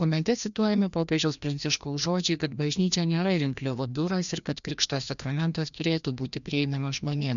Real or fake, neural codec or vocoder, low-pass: fake; codec, 16 kHz, 1 kbps, FreqCodec, larger model; 7.2 kHz